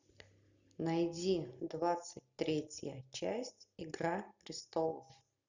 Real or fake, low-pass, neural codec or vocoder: real; 7.2 kHz; none